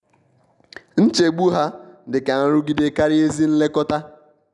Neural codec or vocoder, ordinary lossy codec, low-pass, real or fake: none; none; 10.8 kHz; real